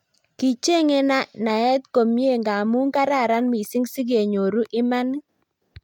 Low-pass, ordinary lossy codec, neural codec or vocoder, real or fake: 19.8 kHz; MP3, 96 kbps; none; real